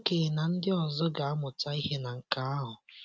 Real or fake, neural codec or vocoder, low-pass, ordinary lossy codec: real; none; none; none